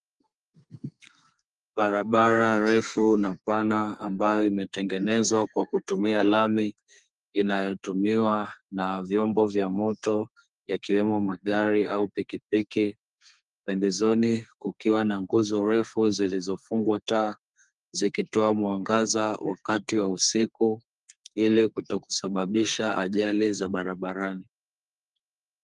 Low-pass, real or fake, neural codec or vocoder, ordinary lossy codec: 10.8 kHz; fake; codec, 32 kHz, 1.9 kbps, SNAC; Opus, 32 kbps